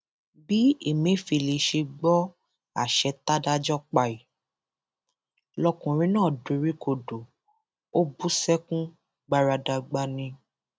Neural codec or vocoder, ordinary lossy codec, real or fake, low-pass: none; none; real; none